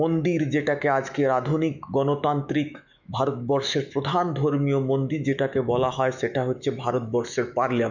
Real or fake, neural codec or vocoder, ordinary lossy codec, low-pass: fake; autoencoder, 48 kHz, 128 numbers a frame, DAC-VAE, trained on Japanese speech; none; 7.2 kHz